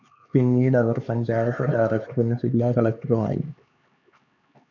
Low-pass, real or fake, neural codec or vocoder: 7.2 kHz; fake; codec, 16 kHz, 4 kbps, X-Codec, HuBERT features, trained on LibriSpeech